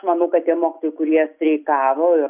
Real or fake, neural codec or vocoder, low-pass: real; none; 3.6 kHz